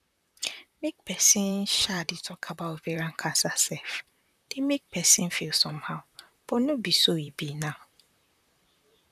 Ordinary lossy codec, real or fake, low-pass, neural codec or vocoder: none; fake; 14.4 kHz; vocoder, 44.1 kHz, 128 mel bands, Pupu-Vocoder